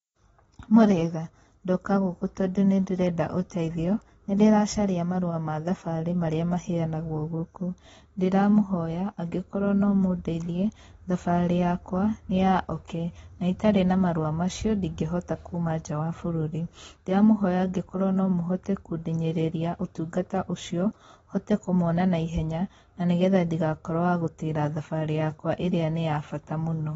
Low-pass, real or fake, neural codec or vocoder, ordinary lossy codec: 9.9 kHz; real; none; AAC, 24 kbps